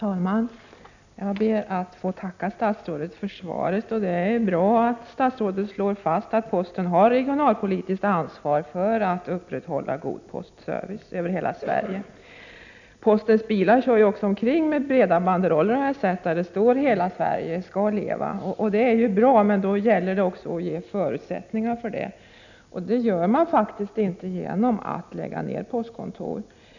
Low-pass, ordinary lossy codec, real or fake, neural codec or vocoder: 7.2 kHz; none; real; none